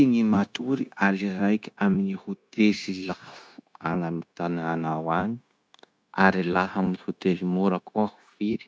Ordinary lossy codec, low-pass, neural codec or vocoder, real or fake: none; none; codec, 16 kHz, 0.9 kbps, LongCat-Audio-Codec; fake